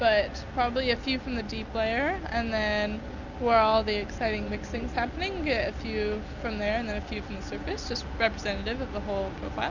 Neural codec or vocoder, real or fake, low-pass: none; real; 7.2 kHz